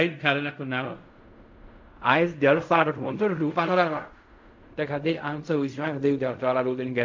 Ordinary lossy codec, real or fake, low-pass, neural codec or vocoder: MP3, 48 kbps; fake; 7.2 kHz; codec, 16 kHz in and 24 kHz out, 0.4 kbps, LongCat-Audio-Codec, fine tuned four codebook decoder